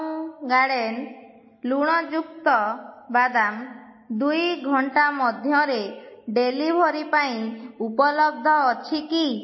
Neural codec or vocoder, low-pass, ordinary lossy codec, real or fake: none; 7.2 kHz; MP3, 24 kbps; real